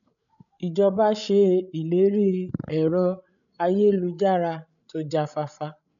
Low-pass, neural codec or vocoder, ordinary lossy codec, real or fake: 7.2 kHz; codec, 16 kHz, 16 kbps, FreqCodec, larger model; none; fake